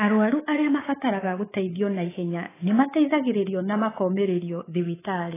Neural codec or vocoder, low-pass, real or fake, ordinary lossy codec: codec, 24 kHz, 3.1 kbps, DualCodec; 3.6 kHz; fake; AAC, 16 kbps